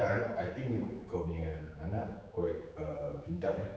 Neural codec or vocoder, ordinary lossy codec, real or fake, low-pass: codec, 16 kHz, 4 kbps, X-Codec, HuBERT features, trained on general audio; none; fake; none